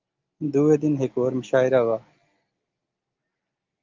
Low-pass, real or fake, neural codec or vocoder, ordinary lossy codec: 7.2 kHz; real; none; Opus, 24 kbps